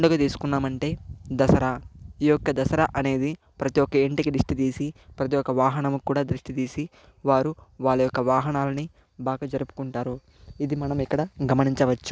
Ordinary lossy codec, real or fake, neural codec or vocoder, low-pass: none; real; none; none